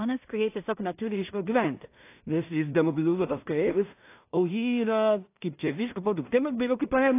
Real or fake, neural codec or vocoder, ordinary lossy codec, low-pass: fake; codec, 16 kHz in and 24 kHz out, 0.4 kbps, LongCat-Audio-Codec, two codebook decoder; AAC, 24 kbps; 3.6 kHz